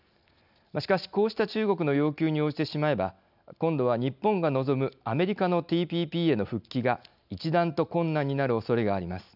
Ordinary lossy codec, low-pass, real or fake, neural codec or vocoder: none; 5.4 kHz; real; none